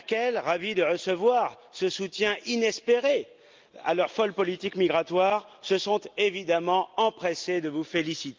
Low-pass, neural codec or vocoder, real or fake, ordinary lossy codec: 7.2 kHz; none; real; Opus, 24 kbps